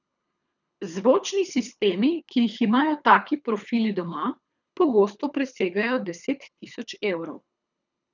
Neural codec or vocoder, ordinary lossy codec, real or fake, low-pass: codec, 24 kHz, 3 kbps, HILCodec; none; fake; 7.2 kHz